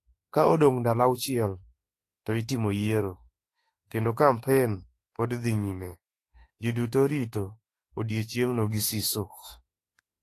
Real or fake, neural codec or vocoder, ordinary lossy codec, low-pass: fake; autoencoder, 48 kHz, 32 numbers a frame, DAC-VAE, trained on Japanese speech; AAC, 48 kbps; 14.4 kHz